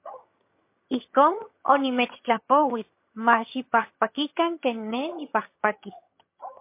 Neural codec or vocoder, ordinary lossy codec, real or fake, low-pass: vocoder, 22.05 kHz, 80 mel bands, HiFi-GAN; MP3, 24 kbps; fake; 3.6 kHz